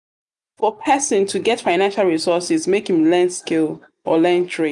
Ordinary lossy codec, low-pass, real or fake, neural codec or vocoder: none; 10.8 kHz; real; none